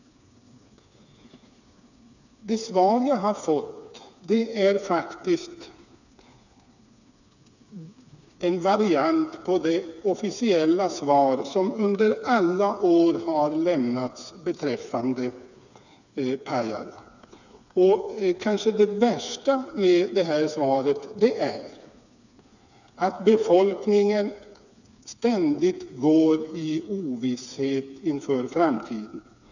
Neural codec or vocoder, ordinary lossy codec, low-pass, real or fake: codec, 16 kHz, 4 kbps, FreqCodec, smaller model; none; 7.2 kHz; fake